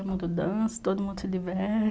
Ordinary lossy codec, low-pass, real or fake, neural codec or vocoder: none; none; real; none